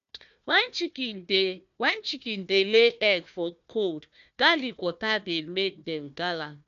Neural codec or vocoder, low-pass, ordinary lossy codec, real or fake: codec, 16 kHz, 1 kbps, FunCodec, trained on Chinese and English, 50 frames a second; 7.2 kHz; none; fake